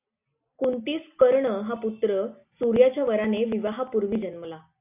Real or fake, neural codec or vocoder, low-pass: real; none; 3.6 kHz